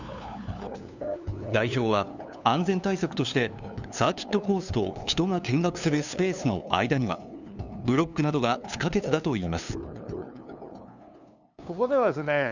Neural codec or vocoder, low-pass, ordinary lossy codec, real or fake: codec, 16 kHz, 2 kbps, FunCodec, trained on LibriTTS, 25 frames a second; 7.2 kHz; none; fake